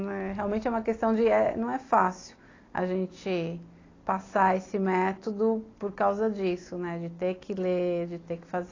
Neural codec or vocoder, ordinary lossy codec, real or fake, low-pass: none; AAC, 32 kbps; real; 7.2 kHz